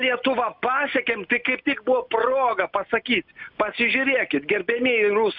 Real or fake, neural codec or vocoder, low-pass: real; none; 5.4 kHz